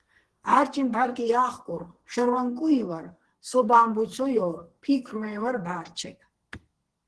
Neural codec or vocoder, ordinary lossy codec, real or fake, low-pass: codec, 32 kHz, 1.9 kbps, SNAC; Opus, 16 kbps; fake; 10.8 kHz